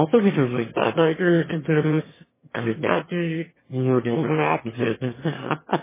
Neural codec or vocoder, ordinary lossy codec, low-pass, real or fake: autoencoder, 22.05 kHz, a latent of 192 numbers a frame, VITS, trained on one speaker; MP3, 16 kbps; 3.6 kHz; fake